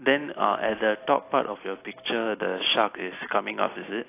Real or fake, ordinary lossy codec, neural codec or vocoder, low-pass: real; AAC, 24 kbps; none; 3.6 kHz